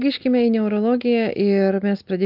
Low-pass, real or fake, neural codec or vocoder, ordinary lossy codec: 5.4 kHz; real; none; Opus, 24 kbps